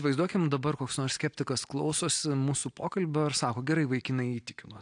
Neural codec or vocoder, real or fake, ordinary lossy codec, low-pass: none; real; AAC, 64 kbps; 9.9 kHz